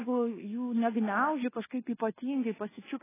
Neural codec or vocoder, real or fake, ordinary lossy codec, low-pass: codec, 24 kHz, 1.2 kbps, DualCodec; fake; AAC, 16 kbps; 3.6 kHz